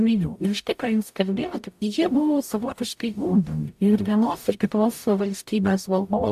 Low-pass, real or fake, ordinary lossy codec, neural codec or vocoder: 14.4 kHz; fake; AAC, 96 kbps; codec, 44.1 kHz, 0.9 kbps, DAC